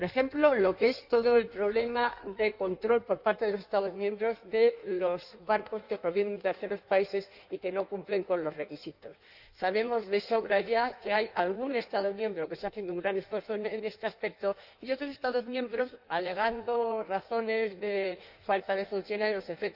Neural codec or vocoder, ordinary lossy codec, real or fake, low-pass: codec, 16 kHz in and 24 kHz out, 1.1 kbps, FireRedTTS-2 codec; none; fake; 5.4 kHz